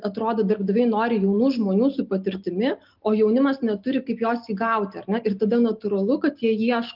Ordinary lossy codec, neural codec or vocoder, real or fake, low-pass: Opus, 32 kbps; none; real; 5.4 kHz